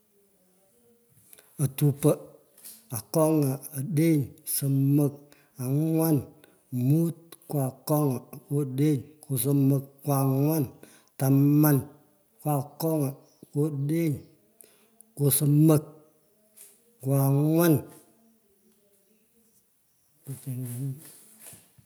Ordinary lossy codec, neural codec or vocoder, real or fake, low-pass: none; none; real; none